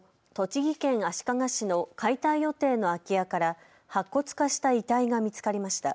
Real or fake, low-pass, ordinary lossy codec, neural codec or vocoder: real; none; none; none